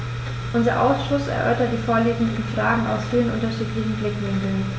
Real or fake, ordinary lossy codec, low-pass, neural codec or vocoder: real; none; none; none